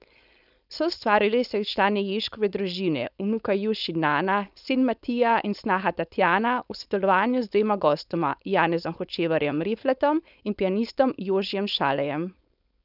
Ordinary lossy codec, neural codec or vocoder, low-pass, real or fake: none; codec, 16 kHz, 4.8 kbps, FACodec; 5.4 kHz; fake